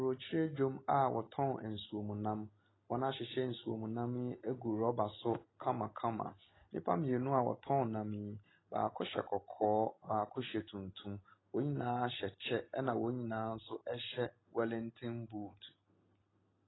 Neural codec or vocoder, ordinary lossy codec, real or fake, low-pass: none; AAC, 16 kbps; real; 7.2 kHz